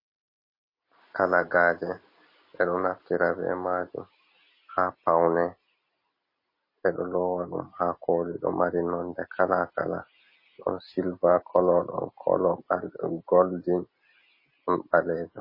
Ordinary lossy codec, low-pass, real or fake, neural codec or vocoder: MP3, 24 kbps; 5.4 kHz; real; none